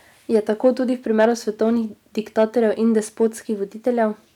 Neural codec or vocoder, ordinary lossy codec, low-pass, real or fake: none; none; 19.8 kHz; real